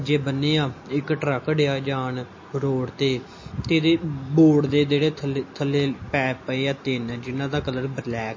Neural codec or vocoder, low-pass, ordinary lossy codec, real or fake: none; 7.2 kHz; MP3, 32 kbps; real